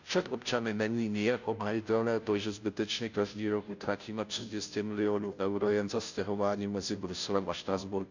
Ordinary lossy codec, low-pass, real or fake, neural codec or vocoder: none; 7.2 kHz; fake; codec, 16 kHz, 0.5 kbps, FunCodec, trained on Chinese and English, 25 frames a second